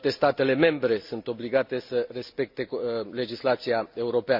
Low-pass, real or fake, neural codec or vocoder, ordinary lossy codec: 5.4 kHz; real; none; MP3, 48 kbps